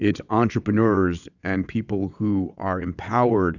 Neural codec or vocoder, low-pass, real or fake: vocoder, 22.05 kHz, 80 mel bands, WaveNeXt; 7.2 kHz; fake